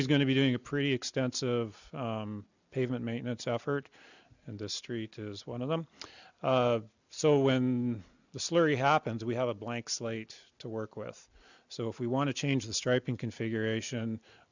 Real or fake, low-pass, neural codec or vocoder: real; 7.2 kHz; none